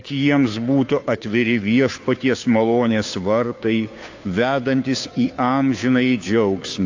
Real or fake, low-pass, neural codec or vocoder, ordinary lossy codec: fake; 7.2 kHz; codec, 16 kHz, 2 kbps, FunCodec, trained on Chinese and English, 25 frames a second; AAC, 48 kbps